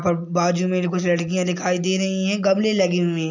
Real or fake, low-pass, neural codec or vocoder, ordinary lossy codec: real; 7.2 kHz; none; none